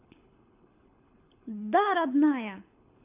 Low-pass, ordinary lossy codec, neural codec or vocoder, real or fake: 3.6 kHz; AAC, 24 kbps; codec, 24 kHz, 6 kbps, HILCodec; fake